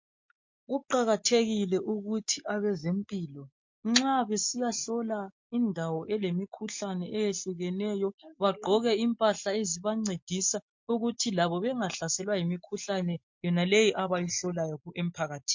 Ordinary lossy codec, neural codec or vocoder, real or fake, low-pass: MP3, 48 kbps; none; real; 7.2 kHz